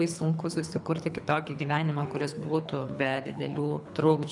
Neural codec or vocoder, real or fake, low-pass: codec, 24 kHz, 3 kbps, HILCodec; fake; 10.8 kHz